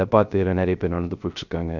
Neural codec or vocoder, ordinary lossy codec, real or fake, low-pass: codec, 16 kHz, 0.3 kbps, FocalCodec; none; fake; 7.2 kHz